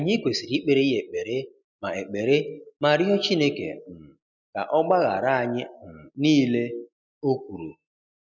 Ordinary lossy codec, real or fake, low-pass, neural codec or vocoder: none; real; 7.2 kHz; none